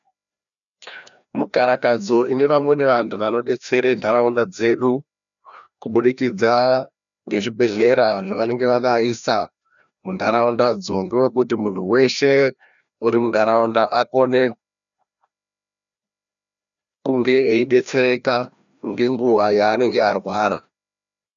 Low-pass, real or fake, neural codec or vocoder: 7.2 kHz; fake; codec, 16 kHz, 1 kbps, FreqCodec, larger model